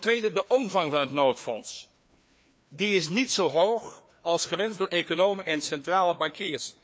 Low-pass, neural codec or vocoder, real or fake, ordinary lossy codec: none; codec, 16 kHz, 2 kbps, FreqCodec, larger model; fake; none